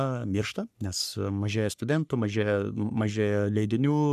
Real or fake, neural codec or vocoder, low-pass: fake; codec, 44.1 kHz, 3.4 kbps, Pupu-Codec; 14.4 kHz